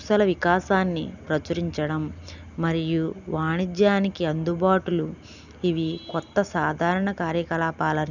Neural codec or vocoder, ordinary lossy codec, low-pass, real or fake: none; none; 7.2 kHz; real